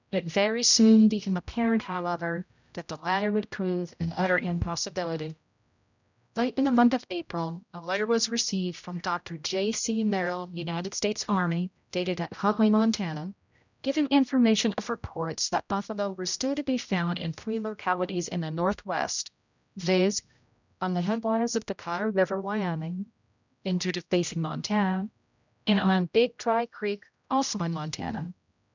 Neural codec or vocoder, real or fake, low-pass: codec, 16 kHz, 0.5 kbps, X-Codec, HuBERT features, trained on general audio; fake; 7.2 kHz